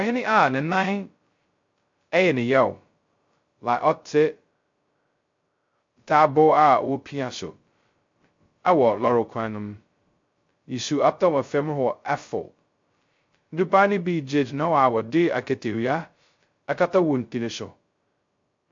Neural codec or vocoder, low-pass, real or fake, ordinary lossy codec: codec, 16 kHz, 0.2 kbps, FocalCodec; 7.2 kHz; fake; MP3, 48 kbps